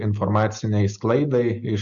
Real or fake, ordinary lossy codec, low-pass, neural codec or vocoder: real; MP3, 96 kbps; 7.2 kHz; none